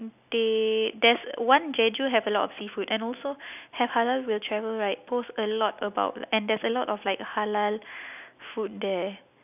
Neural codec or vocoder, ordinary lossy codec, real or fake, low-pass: none; none; real; 3.6 kHz